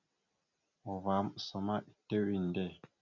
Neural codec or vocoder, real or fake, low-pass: none; real; 7.2 kHz